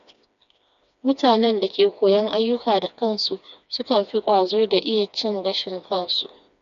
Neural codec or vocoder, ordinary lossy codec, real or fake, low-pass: codec, 16 kHz, 2 kbps, FreqCodec, smaller model; none; fake; 7.2 kHz